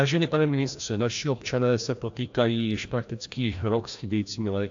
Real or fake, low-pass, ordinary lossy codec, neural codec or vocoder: fake; 7.2 kHz; AAC, 64 kbps; codec, 16 kHz, 1 kbps, FreqCodec, larger model